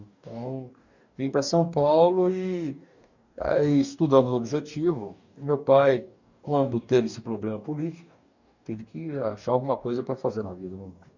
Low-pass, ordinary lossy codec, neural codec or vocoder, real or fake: 7.2 kHz; none; codec, 44.1 kHz, 2.6 kbps, DAC; fake